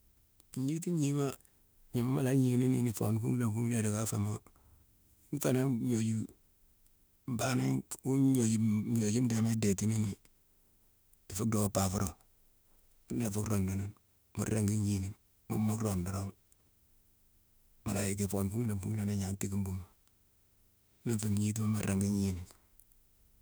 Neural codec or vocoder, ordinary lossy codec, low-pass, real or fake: autoencoder, 48 kHz, 32 numbers a frame, DAC-VAE, trained on Japanese speech; none; none; fake